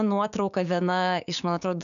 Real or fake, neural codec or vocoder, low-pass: fake; codec, 16 kHz, 6 kbps, DAC; 7.2 kHz